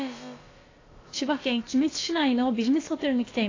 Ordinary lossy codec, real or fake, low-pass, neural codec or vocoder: AAC, 32 kbps; fake; 7.2 kHz; codec, 16 kHz, about 1 kbps, DyCAST, with the encoder's durations